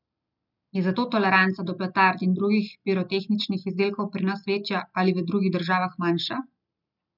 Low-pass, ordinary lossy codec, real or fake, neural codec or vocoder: 5.4 kHz; none; real; none